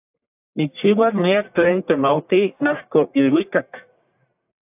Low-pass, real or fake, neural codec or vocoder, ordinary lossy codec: 3.6 kHz; fake; codec, 44.1 kHz, 1.7 kbps, Pupu-Codec; AAC, 32 kbps